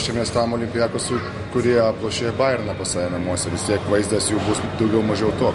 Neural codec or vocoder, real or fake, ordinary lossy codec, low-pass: none; real; MP3, 48 kbps; 14.4 kHz